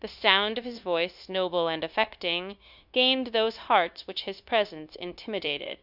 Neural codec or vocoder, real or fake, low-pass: codec, 16 kHz, 0.9 kbps, LongCat-Audio-Codec; fake; 5.4 kHz